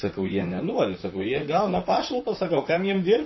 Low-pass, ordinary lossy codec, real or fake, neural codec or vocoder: 7.2 kHz; MP3, 24 kbps; fake; vocoder, 44.1 kHz, 128 mel bands, Pupu-Vocoder